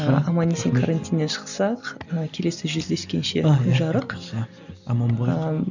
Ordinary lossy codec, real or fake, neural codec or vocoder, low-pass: none; real; none; 7.2 kHz